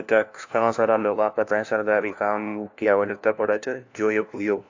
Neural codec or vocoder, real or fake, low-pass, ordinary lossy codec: codec, 16 kHz, 1 kbps, FunCodec, trained on LibriTTS, 50 frames a second; fake; 7.2 kHz; AAC, 48 kbps